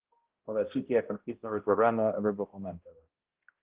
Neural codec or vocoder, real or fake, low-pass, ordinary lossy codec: codec, 16 kHz, 0.5 kbps, X-Codec, HuBERT features, trained on balanced general audio; fake; 3.6 kHz; Opus, 16 kbps